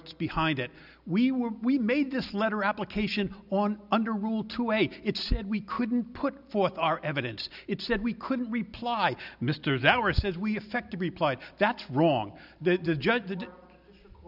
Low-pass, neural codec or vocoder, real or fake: 5.4 kHz; none; real